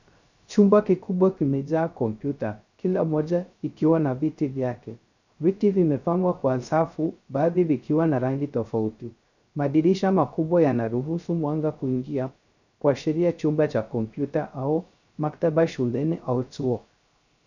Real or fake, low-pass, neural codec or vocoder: fake; 7.2 kHz; codec, 16 kHz, 0.3 kbps, FocalCodec